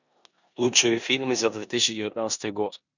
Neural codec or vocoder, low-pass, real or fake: codec, 16 kHz in and 24 kHz out, 0.9 kbps, LongCat-Audio-Codec, four codebook decoder; 7.2 kHz; fake